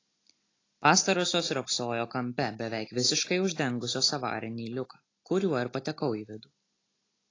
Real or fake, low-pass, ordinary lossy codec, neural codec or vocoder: real; 7.2 kHz; AAC, 32 kbps; none